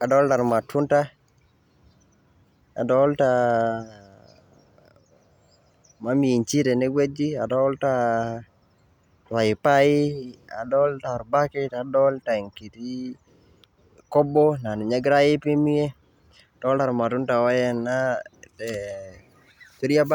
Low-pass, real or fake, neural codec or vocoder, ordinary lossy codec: 19.8 kHz; real; none; none